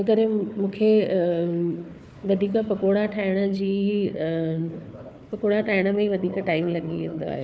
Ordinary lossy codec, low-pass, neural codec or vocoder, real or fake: none; none; codec, 16 kHz, 4 kbps, FunCodec, trained on Chinese and English, 50 frames a second; fake